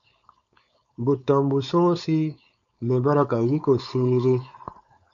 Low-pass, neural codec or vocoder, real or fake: 7.2 kHz; codec, 16 kHz, 4.8 kbps, FACodec; fake